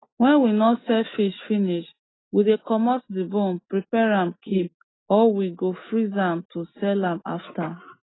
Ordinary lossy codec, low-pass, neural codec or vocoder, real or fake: AAC, 16 kbps; 7.2 kHz; none; real